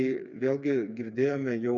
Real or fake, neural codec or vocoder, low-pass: fake; codec, 16 kHz, 4 kbps, FreqCodec, smaller model; 7.2 kHz